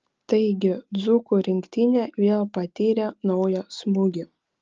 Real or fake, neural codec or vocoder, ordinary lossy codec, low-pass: real; none; Opus, 24 kbps; 7.2 kHz